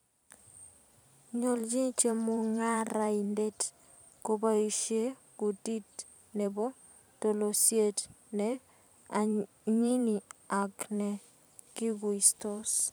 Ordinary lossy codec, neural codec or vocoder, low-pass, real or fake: none; vocoder, 44.1 kHz, 128 mel bands every 512 samples, BigVGAN v2; none; fake